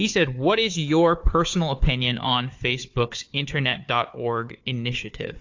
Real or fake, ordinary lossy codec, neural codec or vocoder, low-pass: fake; AAC, 48 kbps; codec, 16 kHz, 4 kbps, FunCodec, trained on Chinese and English, 50 frames a second; 7.2 kHz